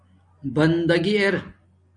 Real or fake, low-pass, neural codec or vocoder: real; 9.9 kHz; none